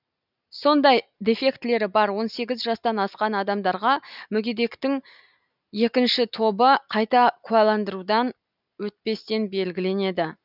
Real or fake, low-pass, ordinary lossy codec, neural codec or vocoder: real; 5.4 kHz; none; none